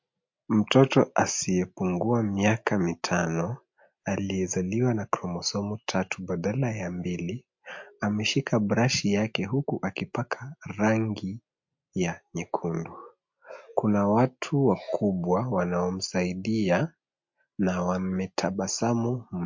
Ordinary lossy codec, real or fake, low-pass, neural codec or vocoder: MP3, 48 kbps; real; 7.2 kHz; none